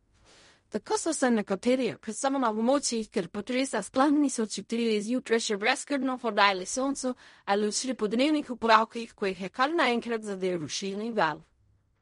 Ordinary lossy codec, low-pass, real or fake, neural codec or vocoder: MP3, 48 kbps; 10.8 kHz; fake; codec, 16 kHz in and 24 kHz out, 0.4 kbps, LongCat-Audio-Codec, fine tuned four codebook decoder